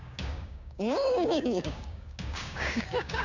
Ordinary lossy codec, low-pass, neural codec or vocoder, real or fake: none; 7.2 kHz; codec, 16 kHz, 6 kbps, DAC; fake